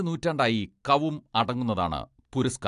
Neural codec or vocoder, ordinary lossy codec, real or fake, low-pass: none; AAC, 64 kbps; real; 10.8 kHz